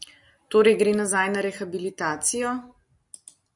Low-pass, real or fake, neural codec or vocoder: 10.8 kHz; real; none